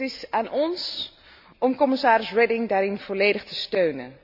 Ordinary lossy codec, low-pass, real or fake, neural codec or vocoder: none; 5.4 kHz; real; none